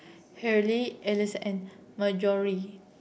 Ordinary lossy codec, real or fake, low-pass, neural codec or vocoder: none; real; none; none